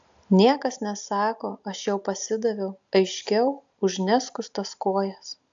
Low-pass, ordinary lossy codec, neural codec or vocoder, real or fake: 7.2 kHz; AAC, 64 kbps; none; real